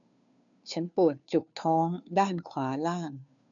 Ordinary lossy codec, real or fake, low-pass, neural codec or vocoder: none; fake; 7.2 kHz; codec, 16 kHz, 2 kbps, FunCodec, trained on Chinese and English, 25 frames a second